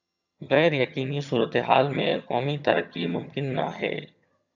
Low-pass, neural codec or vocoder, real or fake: 7.2 kHz; vocoder, 22.05 kHz, 80 mel bands, HiFi-GAN; fake